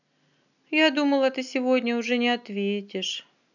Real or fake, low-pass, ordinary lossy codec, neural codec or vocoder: real; 7.2 kHz; none; none